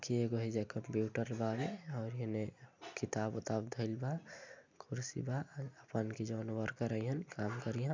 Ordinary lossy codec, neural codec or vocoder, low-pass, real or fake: MP3, 64 kbps; none; 7.2 kHz; real